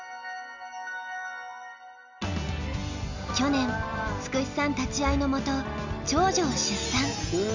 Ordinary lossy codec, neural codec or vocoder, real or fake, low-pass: none; none; real; 7.2 kHz